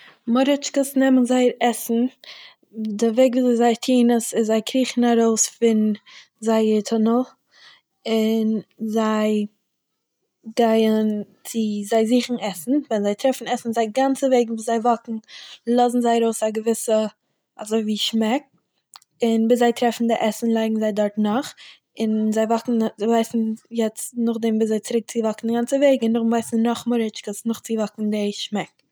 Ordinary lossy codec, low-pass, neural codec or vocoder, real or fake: none; none; none; real